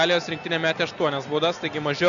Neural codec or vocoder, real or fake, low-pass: none; real; 7.2 kHz